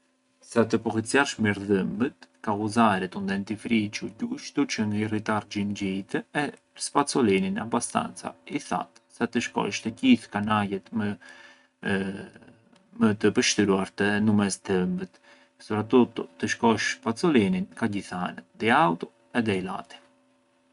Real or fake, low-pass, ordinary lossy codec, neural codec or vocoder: real; 10.8 kHz; none; none